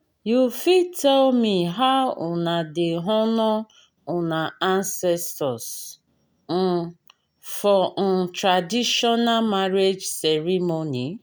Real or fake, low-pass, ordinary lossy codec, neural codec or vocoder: real; none; none; none